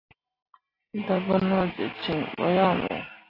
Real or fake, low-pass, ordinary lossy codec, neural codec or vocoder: real; 5.4 kHz; AAC, 24 kbps; none